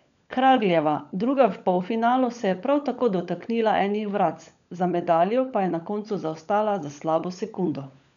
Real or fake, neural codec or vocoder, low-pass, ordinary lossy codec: fake; codec, 16 kHz, 16 kbps, FunCodec, trained on LibriTTS, 50 frames a second; 7.2 kHz; none